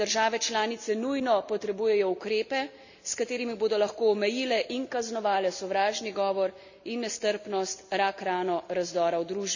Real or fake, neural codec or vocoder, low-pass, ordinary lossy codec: real; none; 7.2 kHz; none